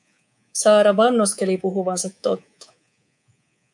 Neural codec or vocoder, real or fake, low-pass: codec, 24 kHz, 3.1 kbps, DualCodec; fake; 10.8 kHz